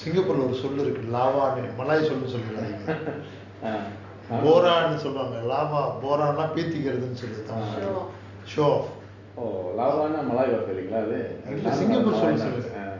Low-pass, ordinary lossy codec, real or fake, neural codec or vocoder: 7.2 kHz; Opus, 64 kbps; real; none